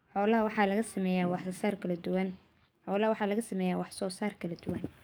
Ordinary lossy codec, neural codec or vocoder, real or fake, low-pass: none; codec, 44.1 kHz, 7.8 kbps, DAC; fake; none